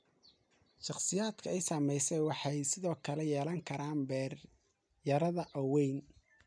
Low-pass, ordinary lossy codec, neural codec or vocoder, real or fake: 9.9 kHz; none; none; real